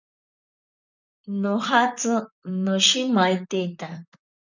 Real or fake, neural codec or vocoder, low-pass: fake; vocoder, 44.1 kHz, 128 mel bands, Pupu-Vocoder; 7.2 kHz